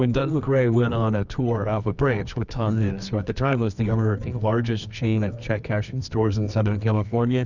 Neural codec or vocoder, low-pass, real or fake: codec, 24 kHz, 0.9 kbps, WavTokenizer, medium music audio release; 7.2 kHz; fake